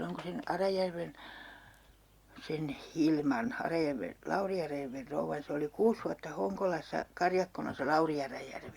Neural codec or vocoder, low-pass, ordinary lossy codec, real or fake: none; 19.8 kHz; Opus, 64 kbps; real